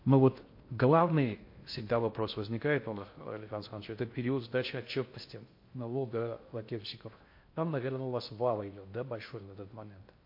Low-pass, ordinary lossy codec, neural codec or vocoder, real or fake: 5.4 kHz; MP3, 32 kbps; codec, 16 kHz in and 24 kHz out, 0.6 kbps, FocalCodec, streaming, 4096 codes; fake